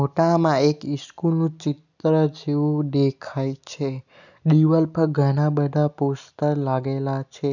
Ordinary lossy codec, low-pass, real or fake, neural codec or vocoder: none; 7.2 kHz; real; none